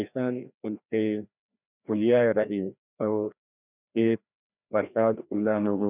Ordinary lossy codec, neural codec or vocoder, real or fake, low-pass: none; codec, 16 kHz, 1 kbps, FreqCodec, larger model; fake; 3.6 kHz